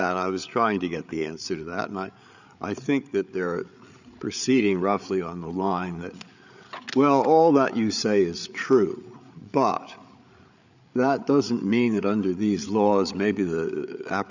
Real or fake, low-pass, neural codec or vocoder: fake; 7.2 kHz; codec, 16 kHz, 8 kbps, FreqCodec, larger model